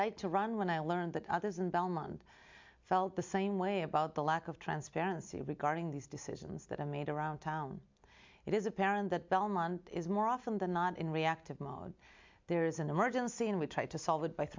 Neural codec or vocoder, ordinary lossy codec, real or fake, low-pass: none; MP3, 64 kbps; real; 7.2 kHz